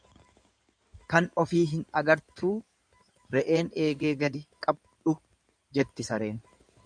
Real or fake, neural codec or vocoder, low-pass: fake; codec, 16 kHz in and 24 kHz out, 2.2 kbps, FireRedTTS-2 codec; 9.9 kHz